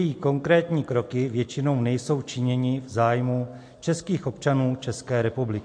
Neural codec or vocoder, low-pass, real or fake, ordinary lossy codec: none; 9.9 kHz; real; MP3, 48 kbps